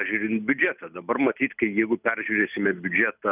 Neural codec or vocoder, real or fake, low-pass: none; real; 3.6 kHz